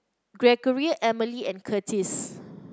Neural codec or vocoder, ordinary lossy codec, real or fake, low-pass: none; none; real; none